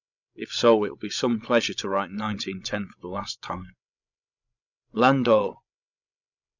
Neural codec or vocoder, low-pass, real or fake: codec, 16 kHz, 16 kbps, FreqCodec, larger model; 7.2 kHz; fake